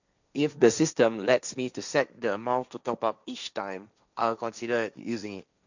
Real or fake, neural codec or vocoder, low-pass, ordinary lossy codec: fake; codec, 16 kHz, 1.1 kbps, Voila-Tokenizer; 7.2 kHz; none